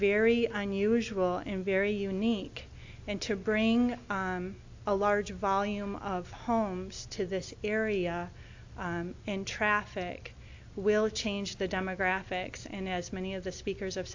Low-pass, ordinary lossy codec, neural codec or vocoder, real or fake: 7.2 kHz; AAC, 48 kbps; none; real